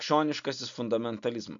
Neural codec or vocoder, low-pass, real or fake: none; 7.2 kHz; real